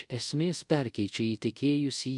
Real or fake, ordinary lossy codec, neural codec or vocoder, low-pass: fake; MP3, 96 kbps; codec, 24 kHz, 0.5 kbps, DualCodec; 10.8 kHz